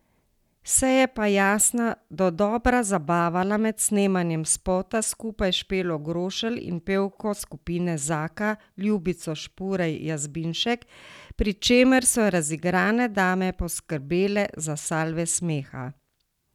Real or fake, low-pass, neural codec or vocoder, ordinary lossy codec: real; 19.8 kHz; none; none